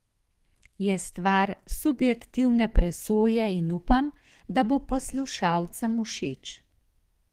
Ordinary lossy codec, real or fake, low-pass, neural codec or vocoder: Opus, 24 kbps; fake; 14.4 kHz; codec, 32 kHz, 1.9 kbps, SNAC